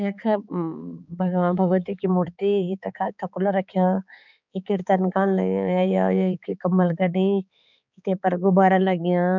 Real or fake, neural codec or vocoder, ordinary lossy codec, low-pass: fake; codec, 16 kHz, 4 kbps, X-Codec, HuBERT features, trained on balanced general audio; none; 7.2 kHz